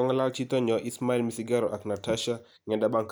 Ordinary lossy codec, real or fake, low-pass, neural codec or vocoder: none; real; none; none